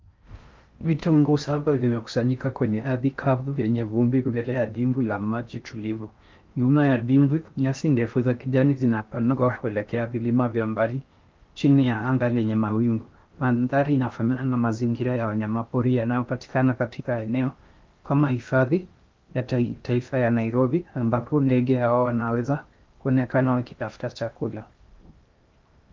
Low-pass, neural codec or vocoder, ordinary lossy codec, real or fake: 7.2 kHz; codec, 16 kHz in and 24 kHz out, 0.6 kbps, FocalCodec, streaming, 4096 codes; Opus, 32 kbps; fake